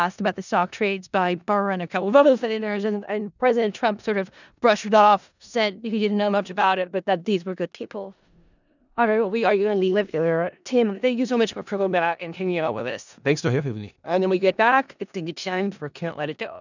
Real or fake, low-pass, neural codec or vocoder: fake; 7.2 kHz; codec, 16 kHz in and 24 kHz out, 0.4 kbps, LongCat-Audio-Codec, four codebook decoder